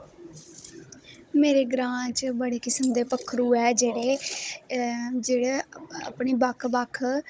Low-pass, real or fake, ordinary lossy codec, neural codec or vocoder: none; fake; none; codec, 16 kHz, 16 kbps, FunCodec, trained on Chinese and English, 50 frames a second